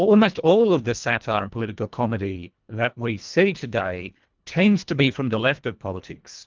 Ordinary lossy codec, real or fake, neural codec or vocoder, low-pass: Opus, 24 kbps; fake; codec, 24 kHz, 1.5 kbps, HILCodec; 7.2 kHz